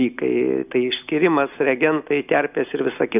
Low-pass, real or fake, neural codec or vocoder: 3.6 kHz; real; none